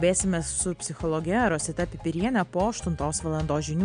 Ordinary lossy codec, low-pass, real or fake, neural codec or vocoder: MP3, 64 kbps; 9.9 kHz; real; none